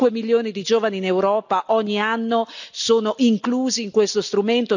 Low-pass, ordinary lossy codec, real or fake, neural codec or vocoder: 7.2 kHz; none; real; none